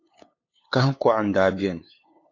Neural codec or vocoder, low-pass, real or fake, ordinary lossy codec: codec, 16 kHz, 8 kbps, FunCodec, trained on LibriTTS, 25 frames a second; 7.2 kHz; fake; AAC, 32 kbps